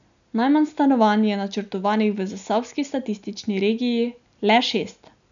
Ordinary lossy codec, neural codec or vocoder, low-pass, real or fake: MP3, 96 kbps; none; 7.2 kHz; real